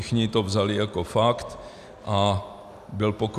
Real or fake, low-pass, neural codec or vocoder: real; 14.4 kHz; none